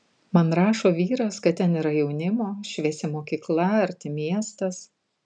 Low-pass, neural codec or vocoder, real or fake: 9.9 kHz; none; real